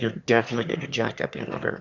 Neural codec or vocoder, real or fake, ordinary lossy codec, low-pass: autoencoder, 22.05 kHz, a latent of 192 numbers a frame, VITS, trained on one speaker; fake; Opus, 64 kbps; 7.2 kHz